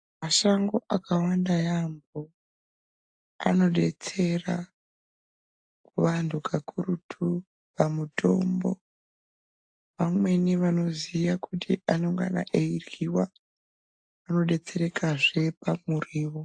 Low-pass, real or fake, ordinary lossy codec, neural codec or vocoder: 9.9 kHz; real; AAC, 64 kbps; none